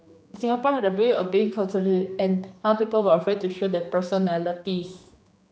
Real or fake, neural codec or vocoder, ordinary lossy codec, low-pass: fake; codec, 16 kHz, 2 kbps, X-Codec, HuBERT features, trained on general audio; none; none